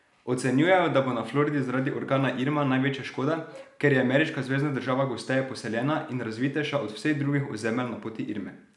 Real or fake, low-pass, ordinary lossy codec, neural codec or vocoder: real; 10.8 kHz; none; none